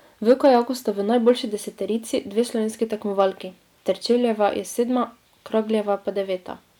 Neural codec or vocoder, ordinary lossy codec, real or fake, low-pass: none; none; real; 19.8 kHz